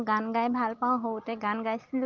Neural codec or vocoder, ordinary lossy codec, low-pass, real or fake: none; Opus, 32 kbps; 7.2 kHz; real